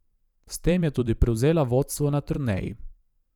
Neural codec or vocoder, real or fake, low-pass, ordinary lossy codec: vocoder, 44.1 kHz, 128 mel bands every 512 samples, BigVGAN v2; fake; 19.8 kHz; none